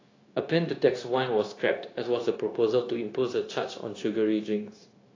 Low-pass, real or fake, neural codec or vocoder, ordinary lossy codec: 7.2 kHz; fake; codec, 16 kHz, 0.9 kbps, LongCat-Audio-Codec; AAC, 32 kbps